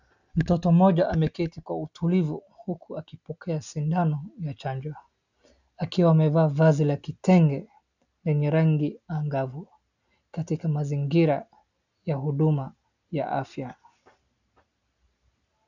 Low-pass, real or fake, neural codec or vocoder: 7.2 kHz; real; none